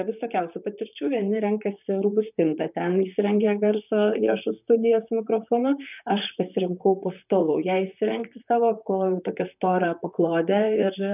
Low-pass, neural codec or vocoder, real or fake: 3.6 kHz; vocoder, 44.1 kHz, 128 mel bands, Pupu-Vocoder; fake